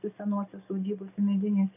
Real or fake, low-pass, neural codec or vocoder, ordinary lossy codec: real; 3.6 kHz; none; AAC, 32 kbps